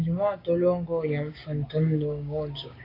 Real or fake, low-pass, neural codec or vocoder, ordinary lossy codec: fake; 5.4 kHz; codec, 16 kHz, 6 kbps, DAC; AAC, 24 kbps